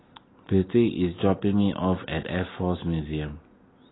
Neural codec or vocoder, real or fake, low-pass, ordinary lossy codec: none; real; 7.2 kHz; AAC, 16 kbps